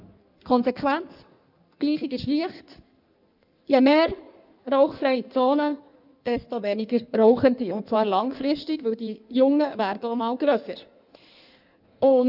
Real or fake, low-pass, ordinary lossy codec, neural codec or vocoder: fake; 5.4 kHz; none; codec, 16 kHz in and 24 kHz out, 1.1 kbps, FireRedTTS-2 codec